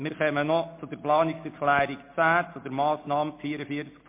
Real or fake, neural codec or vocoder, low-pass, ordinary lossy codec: real; none; 3.6 kHz; MP3, 24 kbps